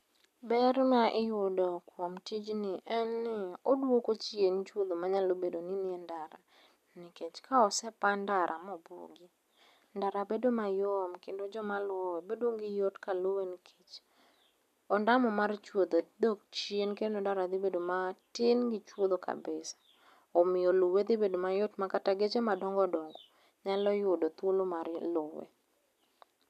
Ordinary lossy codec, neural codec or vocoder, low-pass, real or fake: none; none; 14.4 kHz; real